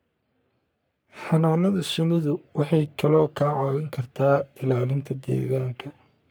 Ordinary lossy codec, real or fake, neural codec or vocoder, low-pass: none; fake; codec, 44.1 kHz, 3.4 kbps, Pupu-Codec; none